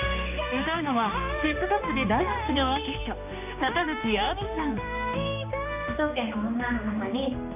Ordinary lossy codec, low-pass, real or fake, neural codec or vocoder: none; 3.6 kHz; fake; codec, 16 kHz, 2 kbps, X-Codec, HuBERT features, trained on general audio